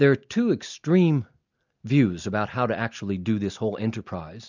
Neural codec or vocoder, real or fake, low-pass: none; real; 7.2 kHz